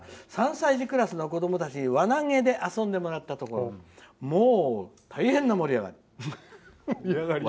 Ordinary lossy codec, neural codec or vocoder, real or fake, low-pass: none; none; real; none